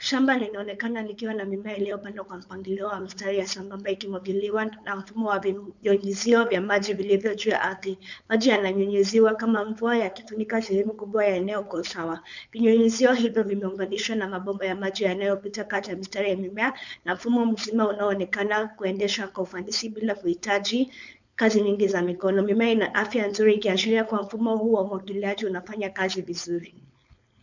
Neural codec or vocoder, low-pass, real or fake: codec, 16 kHz, 4.8 kbps, FACodec; 7.2 kHz; fake